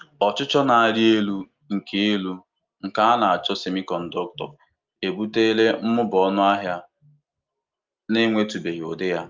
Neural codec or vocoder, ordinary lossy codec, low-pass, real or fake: none; Opus, 24 kbps; 7.2 kHz; real